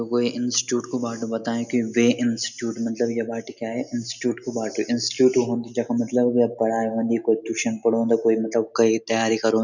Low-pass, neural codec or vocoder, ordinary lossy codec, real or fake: 7.2 kHz; none; none; real